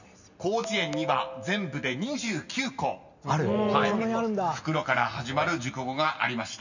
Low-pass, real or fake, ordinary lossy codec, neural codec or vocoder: 7.2 kHz; real; none; none